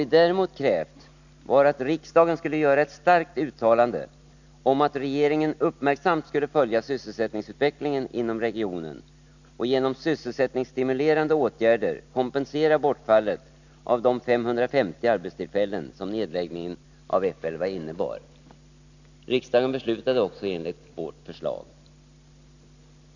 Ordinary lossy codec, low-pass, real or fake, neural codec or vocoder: none; 7.2 kHz; real; none